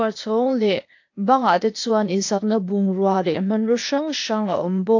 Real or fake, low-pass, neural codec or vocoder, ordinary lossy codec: fake; 7.2 kHz; codec, 16 kHz, 0.8 kbps, ZipCodec; AAC, 48 kbps